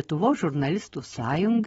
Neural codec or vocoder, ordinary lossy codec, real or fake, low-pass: none; AAC, 24 kbps; real; 19.8 kHz